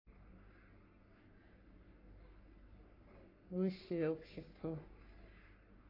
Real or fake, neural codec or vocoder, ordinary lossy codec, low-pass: fake; codec, 44.1 kHz, 3.4 kbps, Pupu-Codec; AAC, 24 kbps; 5.4 kHz